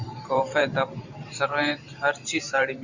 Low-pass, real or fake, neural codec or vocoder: 7.2 kHz; fake; vocoder, 44.1 kHz, 128 mel bands every 512 samples, BigVGAN v2